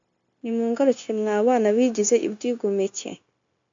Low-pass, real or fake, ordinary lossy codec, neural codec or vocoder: 7.2 kHz; fake; AAC, 64 kbps; codec, 16 kHz, 0.9 kbps, LongCat-Audio-Codec